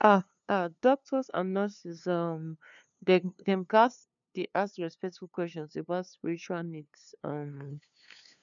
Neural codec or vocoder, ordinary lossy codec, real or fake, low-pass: codec, 16 kHz, 2 kbps, FunCodec, trained on LibriTTS, 25 frames a second; none; fake; 7.2 kHz